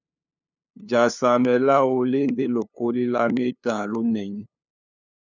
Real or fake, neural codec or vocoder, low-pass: fake; codec, 16 kHz, 2 kbps, FunCodec, trained on LibriTTS, 25 frames a second; 7.2 kHz